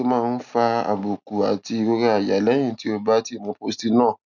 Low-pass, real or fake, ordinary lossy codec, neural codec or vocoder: 7.2 kHz; real; none; none